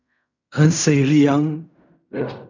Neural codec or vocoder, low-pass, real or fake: codec, 16 kHz in and 24 kHz out, 0.4 kbps, LongCat-Audio-Codec, fine tuned four codebook decoder; 7.2 kHz; fake